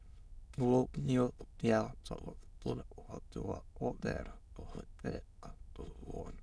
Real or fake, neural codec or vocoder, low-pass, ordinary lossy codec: fake; autoencoder, 22.05 kHz, a latent of 192 numbers a frame, VITS, trained on many speakers; none; none